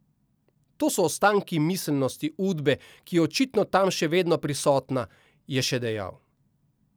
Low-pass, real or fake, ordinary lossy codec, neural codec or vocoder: none; real; none; none